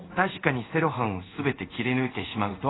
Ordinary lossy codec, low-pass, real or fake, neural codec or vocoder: AAC, 16 kbps; 7.2 kHz; fake; codec, 24 kHz, 0.5 kbps, DualCodec